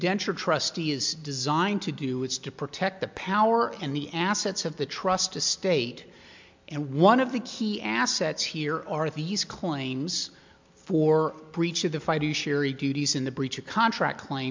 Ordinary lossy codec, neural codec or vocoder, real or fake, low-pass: MP3, 64 kbps; none; real; 7.2 kHz